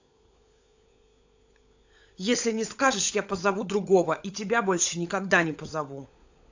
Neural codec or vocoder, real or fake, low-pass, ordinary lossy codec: codec, 16 kHz, 16 kbps, FunCodec, trained on LibriTTS, 50 frames a second; fake; 7.2 kHz; AAC, 48 kbps